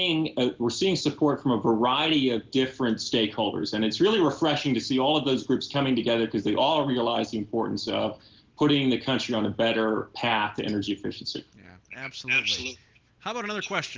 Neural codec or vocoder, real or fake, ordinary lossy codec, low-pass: none; real; Opus, 16 kbps; 7.2 kHz